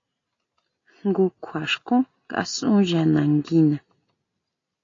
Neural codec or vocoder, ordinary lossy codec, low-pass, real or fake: none; AAC, 32 kbps; 7.2 kHz; real